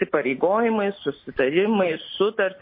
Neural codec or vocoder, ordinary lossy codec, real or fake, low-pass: none; MP3, 24 kbps; real; 5.4 kHz